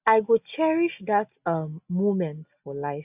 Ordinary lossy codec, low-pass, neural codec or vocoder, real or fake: none; 3.6 kHz; none; real